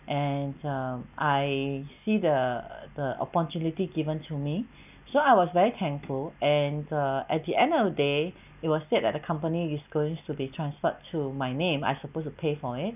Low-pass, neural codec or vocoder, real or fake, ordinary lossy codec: 3.6 kHz; none; real; none